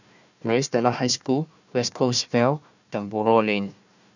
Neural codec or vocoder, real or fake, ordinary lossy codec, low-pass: codec, 16 kHz, 1 kbps, FunCodec, trained on Chinese and English, 50 frames a second; fake; none; 7.2 kHz